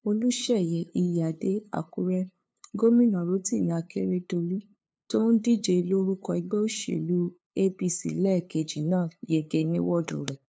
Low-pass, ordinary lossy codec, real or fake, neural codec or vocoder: none; none; fake; codec, 16 kHz, 2 kbps, FunCodec, trained on LibriTTS, 25 frames a second